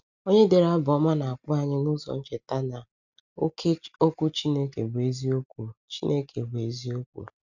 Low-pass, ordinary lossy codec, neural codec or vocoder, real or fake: 7.2 kHz; none; none; real